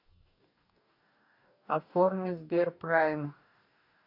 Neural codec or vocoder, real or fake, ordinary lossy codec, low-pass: codec, 44.1 kHz, 2.6 kbps, DAC; fake; none; 5.4 kHz